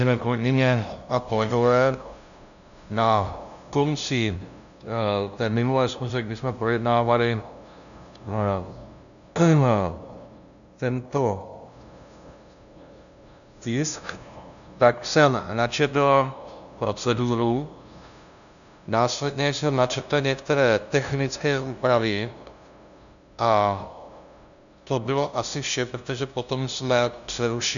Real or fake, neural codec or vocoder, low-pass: fake; codec, 16 kHz, 0.5 kbps, FunCodec, trained on LibriTTS, 25 frames a second; 7.2 kHz